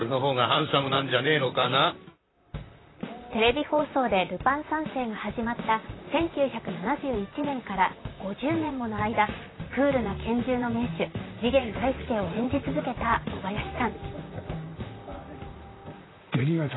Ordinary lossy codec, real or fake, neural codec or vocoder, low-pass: AAC, 16 kbps; fake; vocoder, 44.1 kHz, 128 mel bands, Pupu-Vocoder; 7.2 kHz